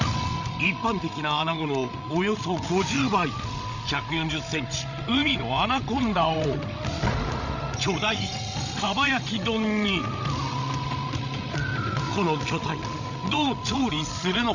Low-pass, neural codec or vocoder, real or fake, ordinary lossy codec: 7.2 kHz; codec, 16 kHz, 16 kbps, FreqCodec, larger model; fake; none